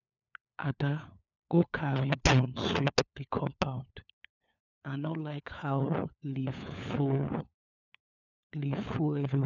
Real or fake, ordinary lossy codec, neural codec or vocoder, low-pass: fake; none; codec, 16 kHz, 4 kbps, FunCodec, trained on LibriTTS, 50 frames a second; 7.2 kHz